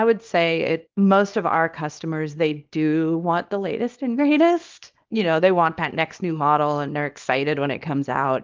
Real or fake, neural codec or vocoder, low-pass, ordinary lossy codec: fake; codec, 24 kHz, 0.9 kbps, WavTokenizer, small release; 7.2 kHz; Opus, 32 kbps